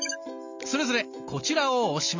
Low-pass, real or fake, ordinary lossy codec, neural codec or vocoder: 7.2 kHz; real; MP3, 64 kbps; none